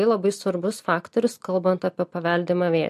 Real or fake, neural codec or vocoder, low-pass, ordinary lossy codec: real; none; 14.4 kHz; MP3, 64 kbps